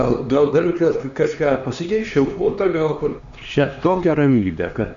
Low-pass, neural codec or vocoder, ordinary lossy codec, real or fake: 7.2 kHz; codec, 16 kHz, 2 kbps, X-Codec, HuBERT features, trained on LibriSpeech; Opus, 64 kbps; fake